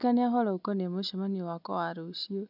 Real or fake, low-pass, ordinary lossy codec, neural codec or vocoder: real; 5.4 kHz; none; none